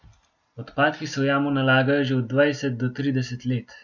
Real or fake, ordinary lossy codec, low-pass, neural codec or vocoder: real; none; 7.2 kHz; none